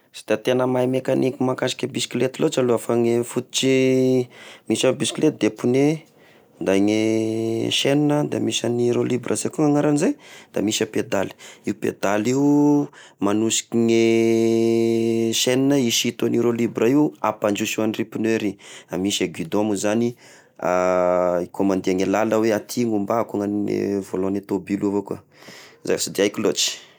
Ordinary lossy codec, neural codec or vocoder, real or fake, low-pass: none; none; real; none